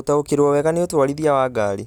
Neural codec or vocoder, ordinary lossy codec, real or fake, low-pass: none; none; real; 19.8 kHz